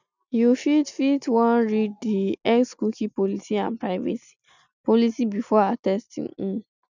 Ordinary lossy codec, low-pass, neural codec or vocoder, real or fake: none; 7.2 kHz; none; real